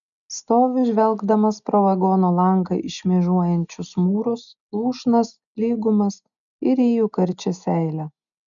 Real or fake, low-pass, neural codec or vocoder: real; 7.2 kHz; none